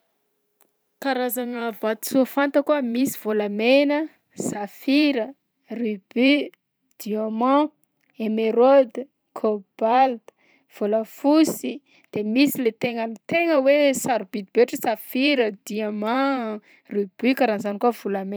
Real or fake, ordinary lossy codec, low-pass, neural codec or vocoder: fake; none; none; vocoder, 44.1 kHz, 128 mel bands every 512 samples, BigVGAN v2